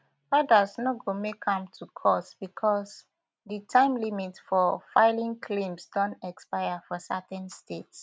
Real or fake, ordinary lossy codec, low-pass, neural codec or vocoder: real; none; none; none